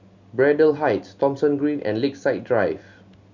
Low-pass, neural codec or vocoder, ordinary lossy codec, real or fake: 7.2 kHz; none; MP3, 64 kbps; real